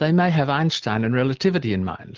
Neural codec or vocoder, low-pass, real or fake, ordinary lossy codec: none; 7.2 kHz; real; Opus, 32 kbps